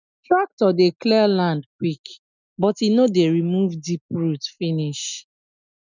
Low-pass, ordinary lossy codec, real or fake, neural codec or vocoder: 7.2 kHz; none; real; none